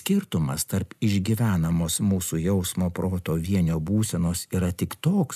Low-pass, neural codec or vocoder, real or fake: 14.4 kHz; none; real